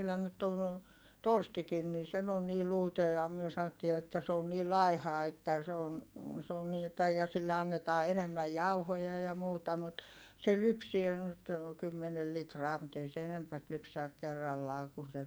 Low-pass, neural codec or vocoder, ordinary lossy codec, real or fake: none; codec, 44.1 kHz, 2.6 kbps, SNAC; none; fake